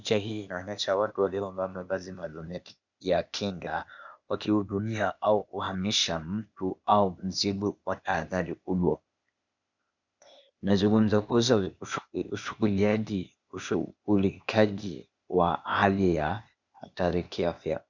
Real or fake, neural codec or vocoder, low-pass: fake; codec, 16 kHz, 0.8 kbps, ZipCodec; 7.2 kHz